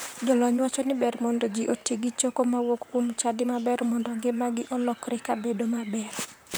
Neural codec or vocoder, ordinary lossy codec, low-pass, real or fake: vocoder, 44.1 kHz, 128 mel bands, Pupu-Vocoder; none; none; fake